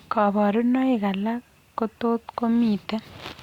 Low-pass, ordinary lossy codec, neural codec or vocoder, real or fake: 19.8 kHz; Opus, 64 kbps; none; real